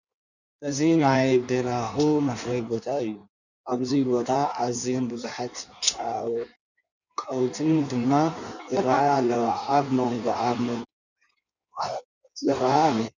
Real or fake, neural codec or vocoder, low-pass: fake; codec, 16 kHz in and 24 kHz out, 1.1 kbps, FireRedTTS-2 codec; 7.2 kHz